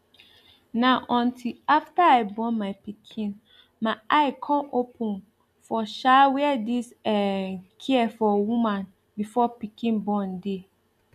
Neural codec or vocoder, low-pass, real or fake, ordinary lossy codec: none; 14.4 kHz; real; none